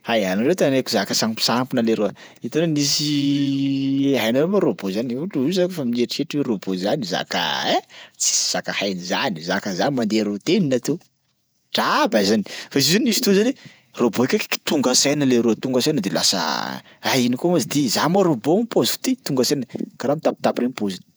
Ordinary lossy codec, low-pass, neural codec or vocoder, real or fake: none; none; vocoder, 48 kHz, 128 mel bands, Vocos; fake